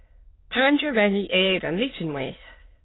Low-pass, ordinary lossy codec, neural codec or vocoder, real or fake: 7.2 kHz; AAC, 16 kbps; autoencoder, 22.05 kHz, a latent of 192 numbers a frame, VITS, trained on many speakers; fake